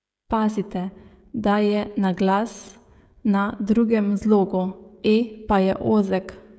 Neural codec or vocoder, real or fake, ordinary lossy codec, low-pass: codec, 16 kHz, 16 kbps, FreqCodec, smaller model; fake; none; none